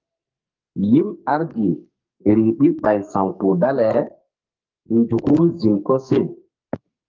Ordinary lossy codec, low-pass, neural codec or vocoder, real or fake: Opus, 24 kbps; 7.2 kHz; codec, 44.1 kHz, 2.6 kbps, SNAC; fake